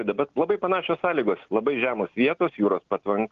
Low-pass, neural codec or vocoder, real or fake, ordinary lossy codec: 7.2 kHz; none; real; Opus, 16 kbps